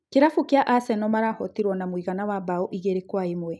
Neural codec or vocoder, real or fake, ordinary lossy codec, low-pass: none; real; none; none